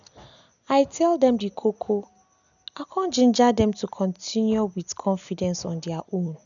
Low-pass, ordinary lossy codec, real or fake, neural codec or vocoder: 7.2 kHz; none; real; none